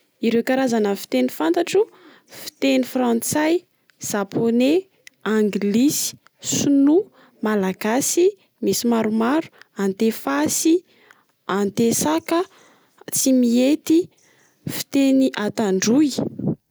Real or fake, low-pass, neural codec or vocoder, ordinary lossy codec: real; none; none; none